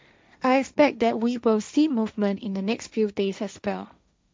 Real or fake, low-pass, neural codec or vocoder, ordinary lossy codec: fake; none; codec, 16 kHz, 1.1 kbps, Voila-Tokenizer; none